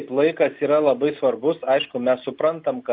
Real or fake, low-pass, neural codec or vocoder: real; 5.4 kHz; none